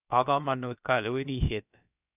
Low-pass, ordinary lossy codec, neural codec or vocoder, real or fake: 3.6 kHz; none; codec, 16 kHz, about 1 kbps, DyCAST, with the encoder's durations; fake